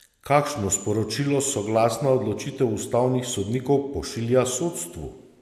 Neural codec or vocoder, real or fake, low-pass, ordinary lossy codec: none; real; 14.4 kHz; AAC, 96 kbps